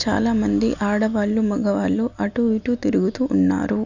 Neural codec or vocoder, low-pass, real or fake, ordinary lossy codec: none; 7.2 kHz; real; none